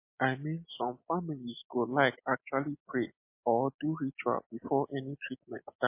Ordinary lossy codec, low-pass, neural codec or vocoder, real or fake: MP3, 24 kbps; 3.6 kHz; none; real